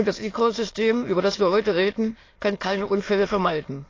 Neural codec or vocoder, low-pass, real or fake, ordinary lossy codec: autoencoder, 22.05 kHz, a latent of 192 numbers a frame, VITS, trained on many speakers; 7.2 kHz; fake; AAC, 32 kbps